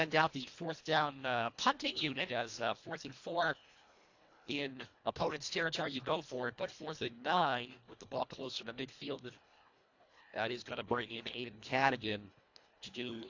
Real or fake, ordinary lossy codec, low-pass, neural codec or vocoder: fake; AAC, 48 kbps; 7.2 kHz; codec, 24 kHz, 1.5 kbps, HILCodec